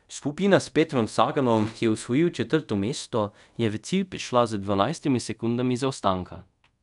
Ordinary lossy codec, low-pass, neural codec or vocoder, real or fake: none; 10.8 kHz; codec, 24 kHz, 0.5 kbps, DualCodec; fake